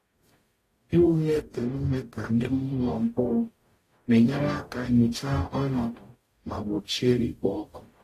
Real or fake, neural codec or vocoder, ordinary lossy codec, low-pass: fake; codec, 44.1 kHz, 0.9 kbps, DAC; AAC, 48 kbps; 14.4 kHz